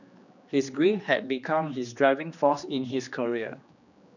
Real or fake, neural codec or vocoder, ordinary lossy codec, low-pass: fake; codec, 16 kHz, 2 kbps, X-Codec, HuBERT features, trained on general audio; none; 7.2 kHz